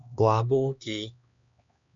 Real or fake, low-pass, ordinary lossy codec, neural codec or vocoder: fake; 7.2 kHz; MP3, 64 kbps; codec, 16 kHz, 2 kbps, X-Codec, HuBERT features, trained on LibriSpeech